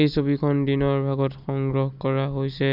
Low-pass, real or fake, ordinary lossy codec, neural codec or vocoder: 5.4 kHz; real; none; none